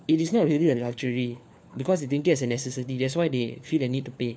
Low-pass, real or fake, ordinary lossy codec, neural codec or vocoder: none; fake; none; codec, 16 kHz, 4 kbps, FunCodec, trained on LibriTTS, 50 frames a second